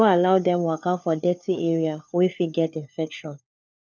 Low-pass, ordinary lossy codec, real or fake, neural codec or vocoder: 7.2 kHz; none; fake; codec, 16 kHz, 4 kbps, FunCodec, trained on LibriTTS, 50 frames a second